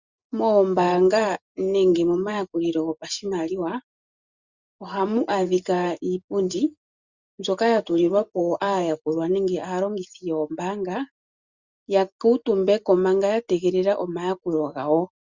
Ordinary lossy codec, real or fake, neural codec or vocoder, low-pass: Opus, 64 kbps; real; none; 7.2 kHz